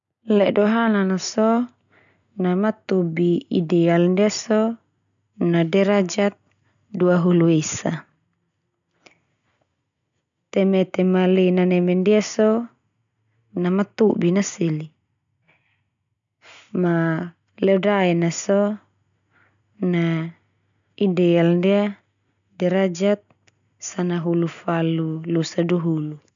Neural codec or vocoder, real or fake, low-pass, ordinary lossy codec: none; real; 7.2 kHz; none